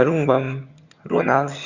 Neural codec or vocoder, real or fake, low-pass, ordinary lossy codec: vocoder, 22.05 kHz, 80 mel bands, HiFi-GAN; fake; 7.2 kHz; Opus, 64 kbps